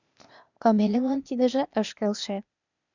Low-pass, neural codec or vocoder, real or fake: 7.2 kHz; codec, 16 kHz, 0.8 kbps, ZipCodec; fake